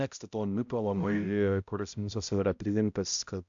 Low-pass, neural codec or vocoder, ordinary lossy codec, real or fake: 7.2 kHz; codec, 16 kHz, 0.5 kbps, X-Codec, HuBERT features, trained on balanced general audio; AAC, 64 kbps; fake